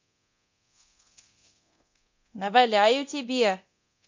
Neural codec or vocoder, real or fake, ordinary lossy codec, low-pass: codec, 24 kHz, 0.9 kbps, DualCodec; fake; MP3, 48 kbps; 7.2 kHz